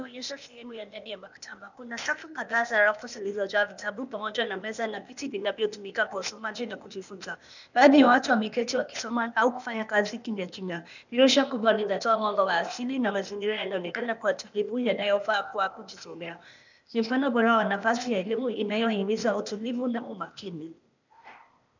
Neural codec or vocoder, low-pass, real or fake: codec, 16 kHz, 0.8 kbps, ZipCodec; 7.2 kHz; fake